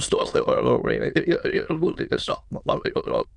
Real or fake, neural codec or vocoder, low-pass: fake; autoencoder, 22.05 kHz, a latent of 192 numbers a frame, VITS, trained on many speakers; 9.9 kHz